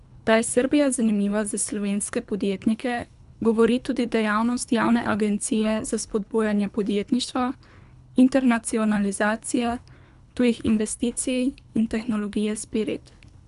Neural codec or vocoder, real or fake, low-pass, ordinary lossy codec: codec, 24 kHz, 3 kbps, HILCodec; fake; 10.8 kHz; none